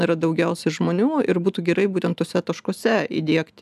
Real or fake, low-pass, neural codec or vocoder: real; 14.4 kHz; none